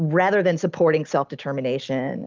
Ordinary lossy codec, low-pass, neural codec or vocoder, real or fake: Opus, 24 kbps; 7.2 kHz; none; real